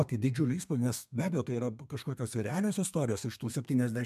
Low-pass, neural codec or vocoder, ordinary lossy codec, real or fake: 14.4 kHz; codec, 32 kHz, 1.9 kbps, SNAC; MP3, 96 kbps; fake